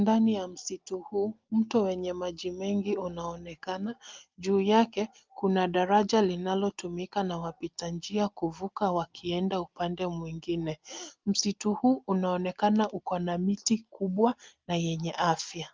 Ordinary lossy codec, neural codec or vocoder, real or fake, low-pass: Opus, 32 kbps; none; real; 7.2 kHz